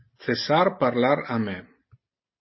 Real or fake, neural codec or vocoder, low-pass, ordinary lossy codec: real; none; 7.2 kHz; MP3, 24 kbps